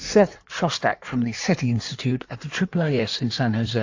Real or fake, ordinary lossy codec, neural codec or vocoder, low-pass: fake; AAC, 48 kbps; codec, 16 kHz in and 24 kHz out, 1.1 kbps, FireRedTTS-2 codec; 7.2 kHz